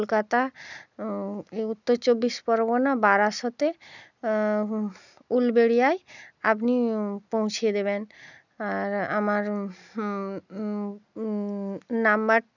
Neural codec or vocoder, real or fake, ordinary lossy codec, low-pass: none; real; none; 7.2 kHz